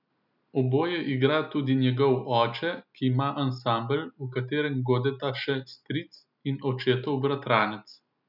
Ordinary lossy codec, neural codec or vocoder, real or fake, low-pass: none; none; real; 5.4 kHz